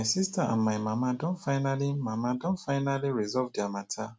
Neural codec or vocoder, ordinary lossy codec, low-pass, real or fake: none; none; none; real